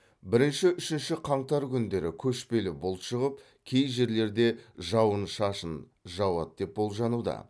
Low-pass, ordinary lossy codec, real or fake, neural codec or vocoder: none; none; real; none